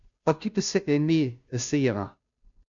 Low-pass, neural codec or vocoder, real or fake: 7.2 kHz; codec, 16 kHz, 0.5 kbps, FunCodec, trained on Chinese and English, 25 frames a second; fake